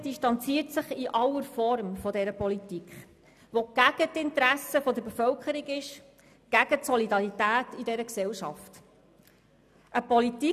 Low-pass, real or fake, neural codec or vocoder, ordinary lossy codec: 14.4 kHz; real; none; none